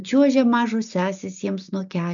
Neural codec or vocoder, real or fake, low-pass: none; real; 7.2 kHz